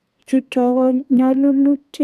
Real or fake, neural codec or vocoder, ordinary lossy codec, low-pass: fake; codec, 32 kHz, 1.9 kbps, SNAC; none; 14.4 kHz